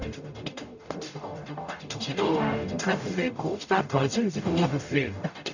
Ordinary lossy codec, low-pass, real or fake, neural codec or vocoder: none; 7.2 kHz; fake; codec, 44.1 kHz, 0.9 kbps, DAC